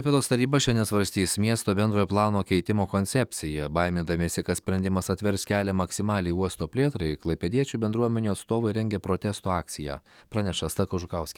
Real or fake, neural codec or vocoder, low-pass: fake; codec, 44.1 kHz, 7.8 kbps, DAC; 19.8 kHz